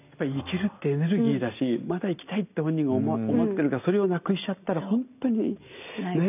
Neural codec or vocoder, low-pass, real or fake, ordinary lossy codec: none; 3.6 kHz; real; none